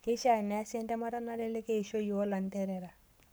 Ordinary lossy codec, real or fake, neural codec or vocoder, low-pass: none; fake; codec, 44.1 kHz, 7.8 kbps, Pupu-Codec; none